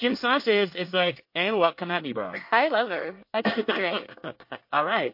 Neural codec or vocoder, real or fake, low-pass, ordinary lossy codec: codec, 24 kHz, 1 kbps, SNAC; fake; 5.4 kHz; MP3, 32 kbps